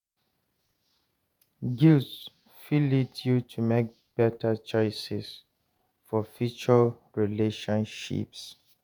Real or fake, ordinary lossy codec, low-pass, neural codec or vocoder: fake; none; none; vocoder, 48 kHz, 128 mel bands, Vocos